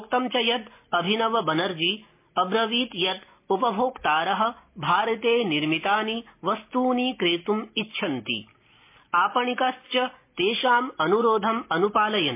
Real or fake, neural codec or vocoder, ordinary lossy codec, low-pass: real; none; MP3, 24 kbps; 3.6 kHz